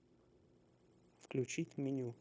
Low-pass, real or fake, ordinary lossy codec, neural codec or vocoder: none; fake; none; codec, 16 kHz, 0.9 kbps, LongCat-Audio-Codec